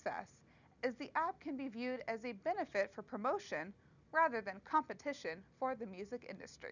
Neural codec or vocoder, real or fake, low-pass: none; real; 7.2 kHz